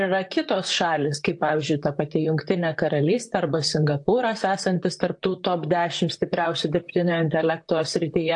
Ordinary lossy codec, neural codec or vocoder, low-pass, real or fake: AAC, 64 kbps; vocoder, 44.1 kHz, 128 mel bands, Pupu-Vocoder; 10.8 kHz; fake